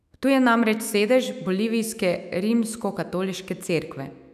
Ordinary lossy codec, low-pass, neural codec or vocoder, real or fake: none; 14.4 kHz; autoencoder, 48 kHz, 128 numbers a frame, DAC-VAE, trained on Japanese speech; fake